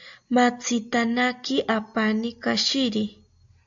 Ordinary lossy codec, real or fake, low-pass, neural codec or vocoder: AAC, 64 kbps; real; 7.2 kHz; none